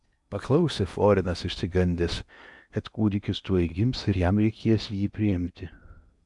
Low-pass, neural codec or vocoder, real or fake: 10.8 kHz; codec, 16 kHz in and 24 kHz out, 0.8 kbps, FocalCodec, streaming, 65536 codes; fake